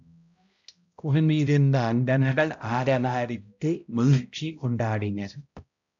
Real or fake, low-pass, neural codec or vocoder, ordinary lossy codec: fake; 7.2 kHz; codec, 16 kHz, 0.5 kbps, X-Codec, HuBERT features, trained on balanced general audio; AAC, 64 kbps